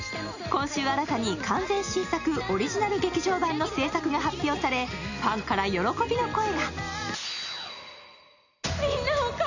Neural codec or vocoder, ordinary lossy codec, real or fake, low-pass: none; none; real; 7.2 kHz